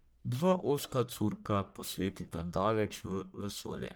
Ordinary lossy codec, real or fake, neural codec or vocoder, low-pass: none; fake; codec, 44.1 kHz, 1.7 kbps, Pupu-Codec; none